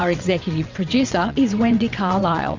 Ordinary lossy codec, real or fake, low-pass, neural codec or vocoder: MP3, 64 kbps; fake; 7.2 kHz; vocoder, 22.05 kHz, 80 mel bands, WaveNeXt